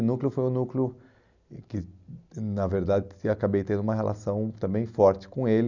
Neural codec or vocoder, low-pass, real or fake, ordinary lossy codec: none; 7.2 kHz; real; none